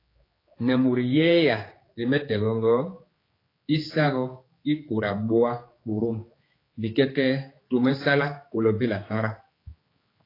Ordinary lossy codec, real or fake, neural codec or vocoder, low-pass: AAC, 24 kbps; fake; codec, 16 kHz, 4 kbps, X-Codec, HuBERT features, trained on general audio; 5.4 kHz